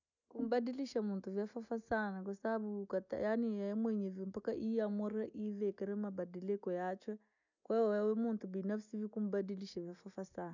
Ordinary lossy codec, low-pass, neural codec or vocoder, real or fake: none; 7.2 kHz; none; real